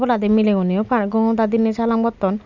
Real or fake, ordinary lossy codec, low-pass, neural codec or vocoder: real; none; 7.2 kHz; none